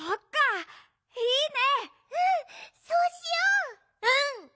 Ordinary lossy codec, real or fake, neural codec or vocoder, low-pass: none; real; none; none